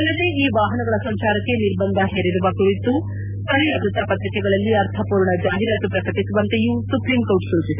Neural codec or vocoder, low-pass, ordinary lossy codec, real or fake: none; 3.6 kHz; none; real